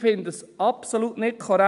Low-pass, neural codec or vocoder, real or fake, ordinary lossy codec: 10.8 kHz; none; real; AAC, 96 kbps